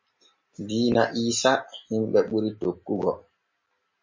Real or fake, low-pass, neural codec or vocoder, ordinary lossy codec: real; 7.2 kHz; none; MP3, 32 kbps